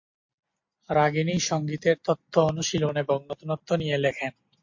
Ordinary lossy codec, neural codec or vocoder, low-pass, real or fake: MP3, 48 kbps; none; 7.2 kHz; real